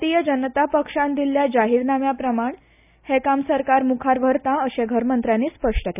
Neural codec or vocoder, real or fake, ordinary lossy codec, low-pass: none; real; none; 3.6 kHz